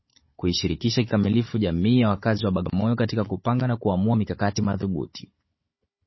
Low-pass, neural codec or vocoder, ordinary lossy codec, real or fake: 7.2 kHz; codec, 16 kHz, 16 kbps, FunCodec, trained on Chinese and English, 50 frames a second; MP3, 24 kbps; fake